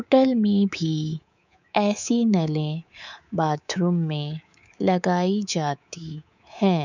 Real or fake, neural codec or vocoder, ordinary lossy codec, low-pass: fake; codec, 24 kHz, 3.1 kbps, DualCodec; none; 7.2 kHz